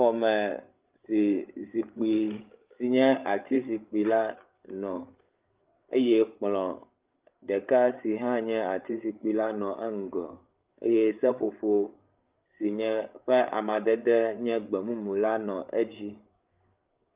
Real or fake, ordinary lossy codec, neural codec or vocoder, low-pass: fake; Opus, 32 kbps; codec, 16 kHz, 8 kbps, FreqCodec, larger model; 3.6 kHz